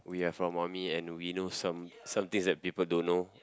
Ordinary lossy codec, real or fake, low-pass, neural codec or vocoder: none; real; none; none